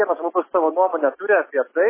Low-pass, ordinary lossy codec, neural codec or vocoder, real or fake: 3.6 kHz; MP3, 16 kbps; none; real